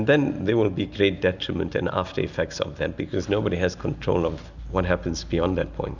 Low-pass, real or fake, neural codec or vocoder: 7.2 kHz; real; none